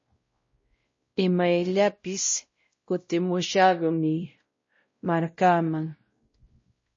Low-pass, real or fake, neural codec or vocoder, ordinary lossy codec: 7.2 kHz; fake; codec, 16 kHz, 0.5 kbps, X-Codec, WavLM features, trained on Multilingual LibriSpeech; MP3, 32 kbps